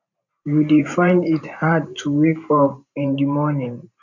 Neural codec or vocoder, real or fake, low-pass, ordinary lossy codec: vocoder, 44.1 kHz, 128 mel bands every 512 samples, BigVGAN v2; fake; 7.2 kHz; none